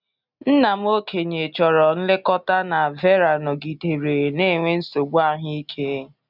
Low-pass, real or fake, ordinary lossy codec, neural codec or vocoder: 5.4 kHz; real; none; none